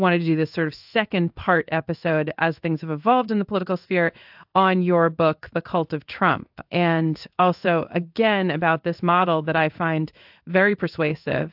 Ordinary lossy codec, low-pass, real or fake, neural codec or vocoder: AAC, 48 kbps; 5.4 kHz; fake; codec, 16 kHz in and 24 kHz out, 1 kbps, XY-Tokenizer